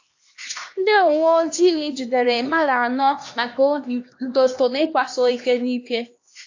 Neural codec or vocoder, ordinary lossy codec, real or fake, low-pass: codec, 16 kHz, 2 kbps, X-Codec, HuBERT features, trained on LibriSpeech; AAC, 48 kbps; fake; 7.2 kHz